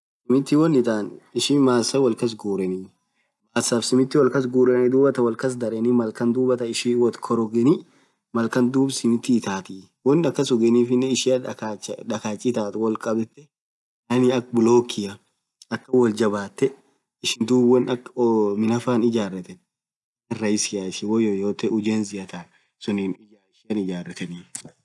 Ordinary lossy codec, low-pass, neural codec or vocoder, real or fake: none; none; none; real